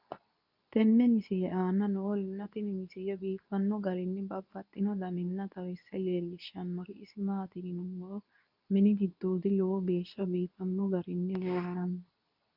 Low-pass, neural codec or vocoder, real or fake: 5.4 kHz; codec, 24 kHz, 0.9 kbps, WavTokenizer, medium speech release version 2; fake